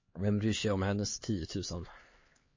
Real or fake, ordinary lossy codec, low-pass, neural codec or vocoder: fake; MP3, 32 kbps; 7.2 kHz; codec, 16 kHz, 4 kbps, X-Codec, HuBERT features, trained on LibriSpeech